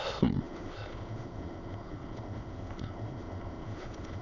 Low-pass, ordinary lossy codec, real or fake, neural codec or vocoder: 7.2 kHz; none; fake; autoencoder, 22.05 kHz, a latent of 192 numbers a frame, VITS, trained on many speakers